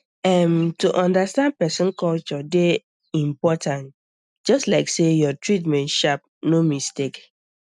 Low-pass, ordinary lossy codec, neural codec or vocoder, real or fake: 10.8 kHz; none; none; real